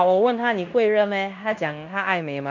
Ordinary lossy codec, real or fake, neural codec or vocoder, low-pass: MP3, 64 kbps; fake; codec, 16 kHz in and 24 kHz out, 0.9 kbps, LongCat-Audio-Codec, fine tuned four codebook decoder; 7.2 kHz